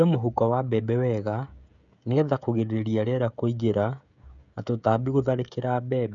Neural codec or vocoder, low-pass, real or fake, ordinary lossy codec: codec, 16 kHz, 16 kbps, FreqCodec, smaller model; 7.2 kHz; fake; none